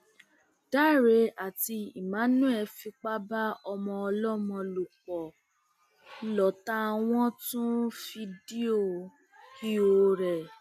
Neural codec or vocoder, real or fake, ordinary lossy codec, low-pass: none; real; none; 14.4 kHz